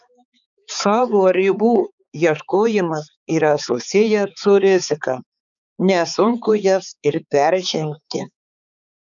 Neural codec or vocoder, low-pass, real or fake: codec, 16 kHz, 4 kbps, X-Codec, HuBERT features, trained on balanced general audio; 7.2 kHz; fake